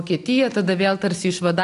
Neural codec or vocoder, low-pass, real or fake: none; 10.8 kHz; real